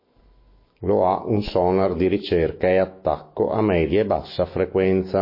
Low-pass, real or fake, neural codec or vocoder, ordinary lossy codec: 5.4 kHz; fake; autoencoder, 48 kHz, 128 numbers a frame, DAC-VAE, trained on Japanese speech; MP3, 24 kbps